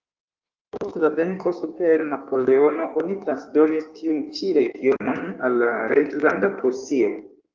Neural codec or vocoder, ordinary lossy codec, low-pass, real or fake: codec, 16 kHz in and 24 kHz out, 1.1 kbps, FireRedTTS-2 codec; Opus, 24 kbps; 7.2 kHz; fake